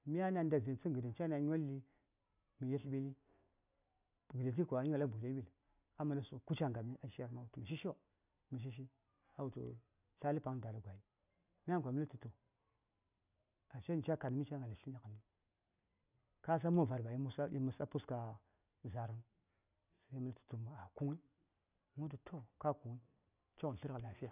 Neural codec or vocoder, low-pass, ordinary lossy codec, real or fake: none; 3.6 kHz; none; real